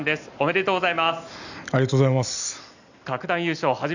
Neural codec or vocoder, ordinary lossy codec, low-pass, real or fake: none; none; 7.2 kHz; real